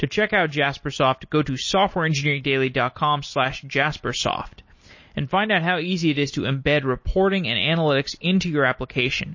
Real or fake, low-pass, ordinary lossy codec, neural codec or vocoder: real; 7.2 kHz; MP3, 32 kbps; none